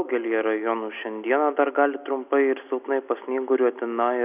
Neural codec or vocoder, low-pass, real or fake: none; 3.6 kHz; real